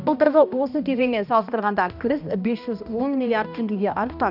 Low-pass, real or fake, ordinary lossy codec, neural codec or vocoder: 5.4 kHz; fake; none; codec, 16 kHz, 1 kbps, X-Codec, HuBERT features, trained on balanced general audio